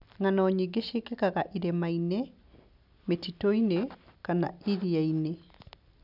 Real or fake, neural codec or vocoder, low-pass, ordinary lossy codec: real; none; 5.4 kHz; none